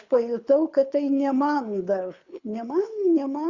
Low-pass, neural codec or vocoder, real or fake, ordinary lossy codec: 7.2 kHz; codec, 24 kHz, 6 kbps, HILCodec; fake; Opus, 64 kbps